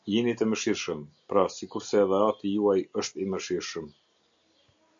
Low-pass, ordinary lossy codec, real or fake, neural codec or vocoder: 7.2 kHz; MP3, 64 kbps; real; none